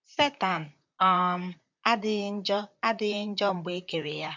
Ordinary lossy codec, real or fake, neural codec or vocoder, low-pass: MP3, 64 kbps; fake; vocoder, 44.1 kHz, 128 mel bands, Pupu-Vocoder; 7.2 kHz